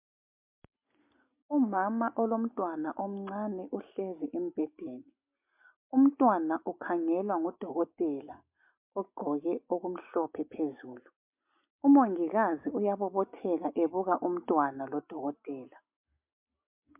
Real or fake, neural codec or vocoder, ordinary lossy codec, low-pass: real; none; MP3, 32 kbps; 3.6 kHz